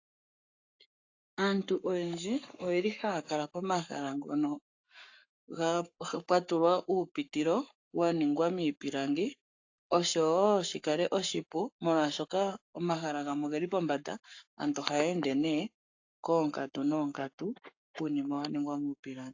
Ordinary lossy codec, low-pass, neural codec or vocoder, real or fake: AAC, 48 kbps; 7.2 kHz; codec, 44.1 kHz, 7.8 kbps, Pupu-Codec; fake